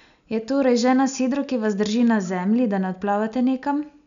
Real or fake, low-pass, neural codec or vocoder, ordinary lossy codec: real; 7.2 kHz; none; none